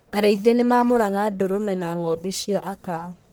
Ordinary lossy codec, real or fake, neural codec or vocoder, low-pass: none; fake; codec, 44.1 kHz, 1.7 kbps, Pupu-Codec; none